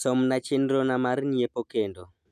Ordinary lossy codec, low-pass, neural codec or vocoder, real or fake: none; 14.4 kHz; none; real